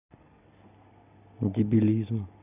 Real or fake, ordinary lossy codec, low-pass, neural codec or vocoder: real; none; 3.6 kHz; none